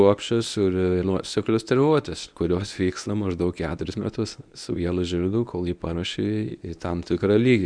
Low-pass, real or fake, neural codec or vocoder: 9.9 kHz; fake; codec, 24 kHz, 0.9 kbps, WavTokenizer, medium speech release version 1